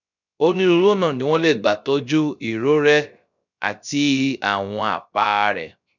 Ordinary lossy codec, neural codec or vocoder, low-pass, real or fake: none; codec, 16 kHz, 0.3 kbps, FocalCodec; 7.2 kHz; fake